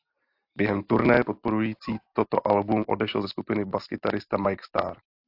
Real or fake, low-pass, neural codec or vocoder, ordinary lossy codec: real; 5.4 kHz; none; AAC, 48 kbps